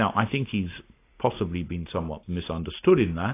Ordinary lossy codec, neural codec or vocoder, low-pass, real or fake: AAC, 24 kbps; none; 3.6 kHz; real